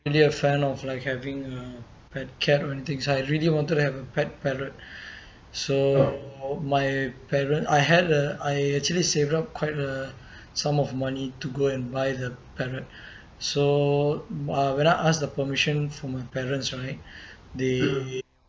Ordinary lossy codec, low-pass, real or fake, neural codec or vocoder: none; none; real; none